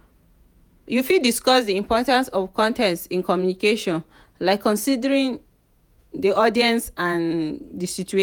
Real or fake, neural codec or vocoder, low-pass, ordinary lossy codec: fake; vocoder, 48 kHz, 128 mel bands, Vocos; none; none